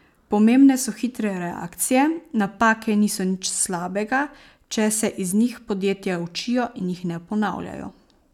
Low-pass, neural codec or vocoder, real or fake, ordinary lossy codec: 19.8 kHz; none; real; none